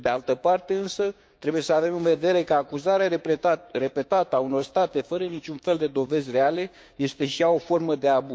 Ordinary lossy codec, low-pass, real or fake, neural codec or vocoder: none; none; fake; codec, 16 kHz, 6 kbps, DAC